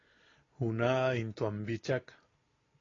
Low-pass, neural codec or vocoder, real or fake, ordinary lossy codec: 7.2 kHz; none; real; AAC, 32 kbps